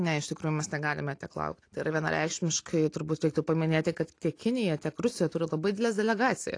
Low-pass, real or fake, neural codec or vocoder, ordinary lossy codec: 9.9 kHz; real; none; AAC, 48 kbps